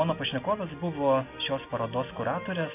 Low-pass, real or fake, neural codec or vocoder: 3.6 kHz; real; none